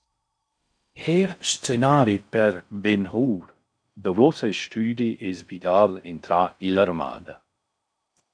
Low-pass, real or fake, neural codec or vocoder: 9.9 kHz; fake; codec, 16 kHz in and 24 kHz out, 0.6 kbps, FocalCodec, streaming, 4096 codes